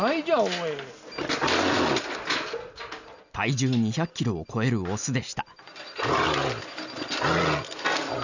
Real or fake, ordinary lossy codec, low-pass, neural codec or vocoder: real; none; 7.2 kHz; none